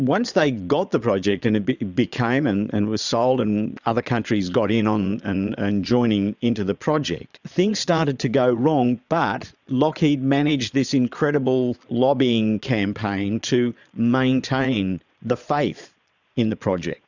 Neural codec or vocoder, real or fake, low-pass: vocoder, 22.05 kHz, 80 mel bands, Vocos; fake; 7.2 kHz